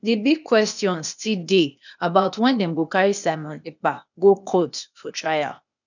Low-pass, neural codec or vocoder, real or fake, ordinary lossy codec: 7.2 kHz; codec, 16 kHz, 0.8 kbps, ZipCodec; fake; none